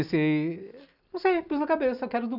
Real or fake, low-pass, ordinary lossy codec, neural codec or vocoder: real; 5.4 kHz; none; none